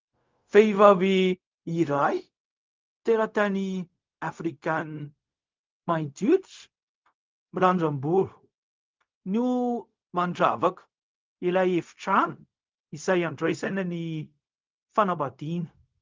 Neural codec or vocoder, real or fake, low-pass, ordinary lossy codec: codec, 16 kHz, 0.4 kbps, LongCat-Audio-Codec; fake; 7.2 kHz; Opus, 24 kbps